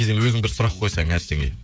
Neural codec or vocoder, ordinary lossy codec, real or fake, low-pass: codec, 16 kHz, 16 kbps, FreqCodec, smaller model; none; fake; none